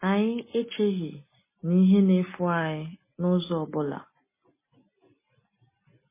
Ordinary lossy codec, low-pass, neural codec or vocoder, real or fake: MP3, 16 kbps; 3.6 kHz; none; real